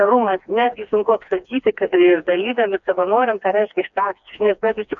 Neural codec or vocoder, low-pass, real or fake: codec, 16 kHz, 2 kbps, FreqCodec, smaller model; 7.2 kHz; fake